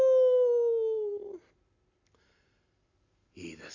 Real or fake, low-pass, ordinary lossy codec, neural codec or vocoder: real; 7.2 kHz; none; none